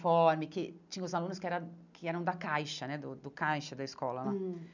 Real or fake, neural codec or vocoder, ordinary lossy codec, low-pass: real; none; none; 7.2 kHz